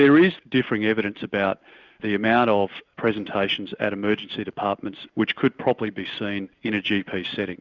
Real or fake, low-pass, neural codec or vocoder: real; 7.2 kHz; none